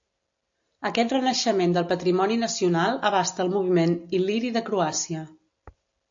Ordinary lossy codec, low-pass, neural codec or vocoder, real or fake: AAC, 64 kbps; 7.2 kHz; none; real